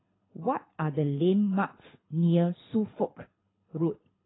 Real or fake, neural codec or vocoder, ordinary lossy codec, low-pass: fake; codec, 24 kHz, 6 kbps, HILCodec; AAC, 16 kbps; 7.2 kHz